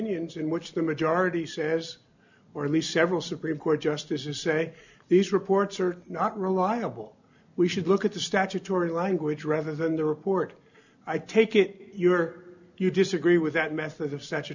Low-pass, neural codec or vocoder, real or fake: 7.2 kHz; none; real